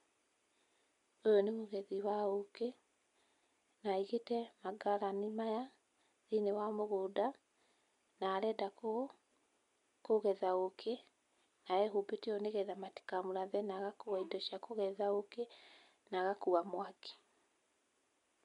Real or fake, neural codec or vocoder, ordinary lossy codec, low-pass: real; none; MP3, 64 kbps; 10.8 kHz